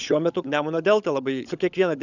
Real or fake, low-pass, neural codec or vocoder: fake; 7.2 kHz; codec, 16 kHz, 16 kbps, FunCodec, trained on LibriTTS, 50 frames a second